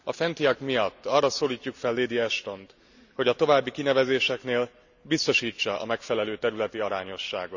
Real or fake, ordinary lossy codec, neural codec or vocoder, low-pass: real; none; none; 7.2 kHz